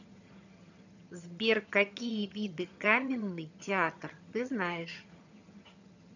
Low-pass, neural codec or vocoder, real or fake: 7.2 kHz; vocoder, 22.05 kHz, 80 mel bands, HiFi-GAN; fake